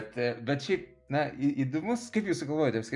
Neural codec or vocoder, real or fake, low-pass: none; real; 10.8 kHz